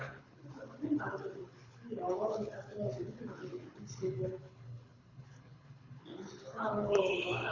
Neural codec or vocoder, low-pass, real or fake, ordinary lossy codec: codec, 24 kHz, 6 kbps, HILCodec; 7.2 kHz; fake; Opus, 64 kbps